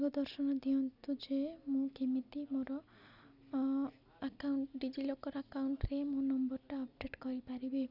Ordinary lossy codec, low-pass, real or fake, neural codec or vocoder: none; 5.4 kHz; real; none